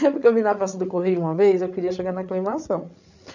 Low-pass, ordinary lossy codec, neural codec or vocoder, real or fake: 7.2 kHz; none; codec, 16 kHz, 8 kbps, FreqCodec, larger model; fake